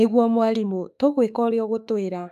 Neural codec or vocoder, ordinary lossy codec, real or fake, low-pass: autoencoder, 48 kHz, 32 numbers a frame, DAC-VAE, trained on Japanese speech; AAC, 96 kbps; fake; 14.4 kHz